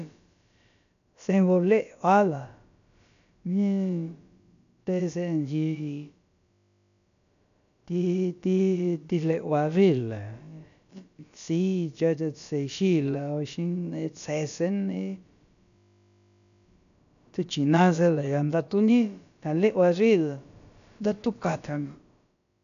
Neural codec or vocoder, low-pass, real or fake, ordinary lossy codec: codec, 16 kHz, about 1 kbps, DyCAST, with the encoder's durations; 7.2 kHz; fake; none